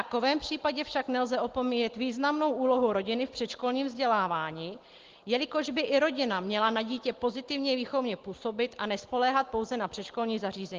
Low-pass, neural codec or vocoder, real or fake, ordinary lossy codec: 7.2 kHz; none; real; Opus, 16 kbps